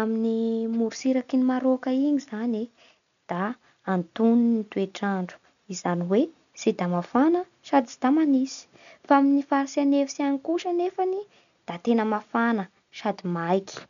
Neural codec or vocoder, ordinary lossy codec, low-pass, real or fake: none; none; 7.2 kHz; real